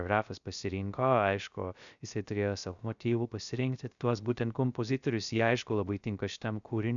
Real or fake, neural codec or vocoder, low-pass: fake; codec, 16 kHz, 0.3 kbps, FocalCodec; 7.2 kHz